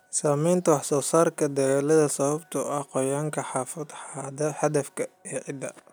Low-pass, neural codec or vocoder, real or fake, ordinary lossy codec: none; none; real; none